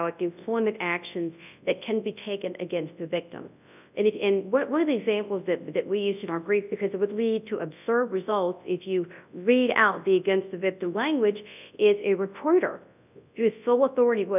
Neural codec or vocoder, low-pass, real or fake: codec, 24 kHz, 0.9 kbps, WavTokenizer, large speech release; 3.6 kHz; fake